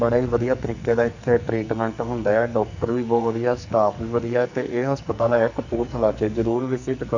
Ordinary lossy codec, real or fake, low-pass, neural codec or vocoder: none; fake; 7.2 kHz; codec, 44.1 kHz, 2.6 kbps, SNAC